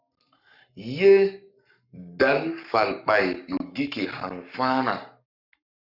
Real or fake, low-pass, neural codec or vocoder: fake; 5.4 kHz; codec, 44.1 kHz, 7.8 kbps, Pupu-Codec